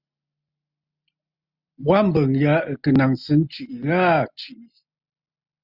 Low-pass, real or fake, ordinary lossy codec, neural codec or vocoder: 5.4 kHz; real; Opus, 64 kbps; none